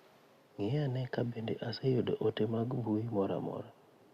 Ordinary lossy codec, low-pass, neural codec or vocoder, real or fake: Opus, 64 kbps; 14.4 kHz; none; real